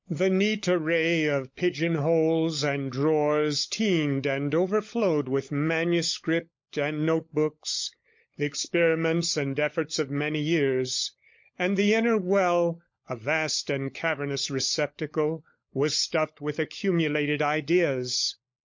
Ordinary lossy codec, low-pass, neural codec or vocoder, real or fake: MP3, 48 kbps; 7.2 kHz; codec, 16 kHz, 16 kbps, FunCodec, trained on LibriTTS, 50 frames a second; fake